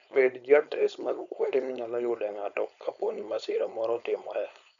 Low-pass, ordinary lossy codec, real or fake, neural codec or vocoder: 7.2 kHz; none; fake; codec, 16 kHz, 4.8 kbps, FACodec